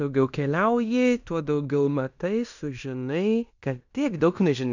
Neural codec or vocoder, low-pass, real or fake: codec, 16 kHz in and 24 kHz out, 0.9 kbps, LongCat-Audio-Codec, fine tuned four codebook decoder; 7.2 kHz; fake